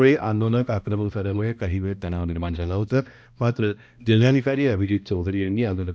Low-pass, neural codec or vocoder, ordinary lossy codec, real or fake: none; codec, 16 kHz, 1 kbps, X-Codec, HuBERT features, trained on balanced general audio; none; fake